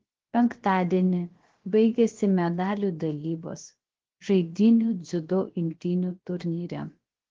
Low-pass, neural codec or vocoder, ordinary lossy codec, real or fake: 7.2 kHz; codec, 16 kHz, about 1 kbps, DyCAST, with the encoder's durations; Opus, 16 kbps; fake